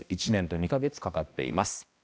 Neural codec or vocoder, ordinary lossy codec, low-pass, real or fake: codec, 16 kHz, 1 kbps, X-Codec, HuBERT features, trained on balanced general audio; none; none; fake